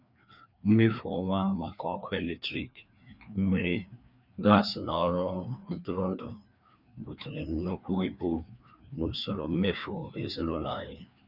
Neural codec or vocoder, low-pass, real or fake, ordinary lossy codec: codec, 16 kHz, 2 kbps, FreqCodec, larger model; 5.4 kHz; fake; none